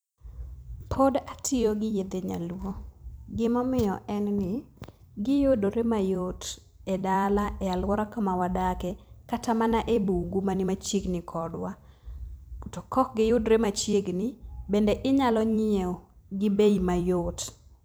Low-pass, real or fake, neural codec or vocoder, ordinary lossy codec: none; fake; vocoder, 44.1 kHz, 128 mel bands every 256 samples, BigVGAN v2; none